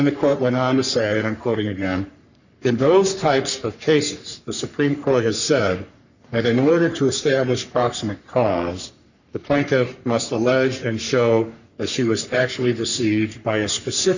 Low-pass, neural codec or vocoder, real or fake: 7.2 kHz; codec, 44.1 kHz, 3.4 kbps, Pupu-Codec; fake